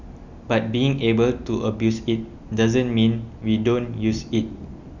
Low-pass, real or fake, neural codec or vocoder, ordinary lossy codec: 7.2 kHz; real; none; Opus, 64 kbps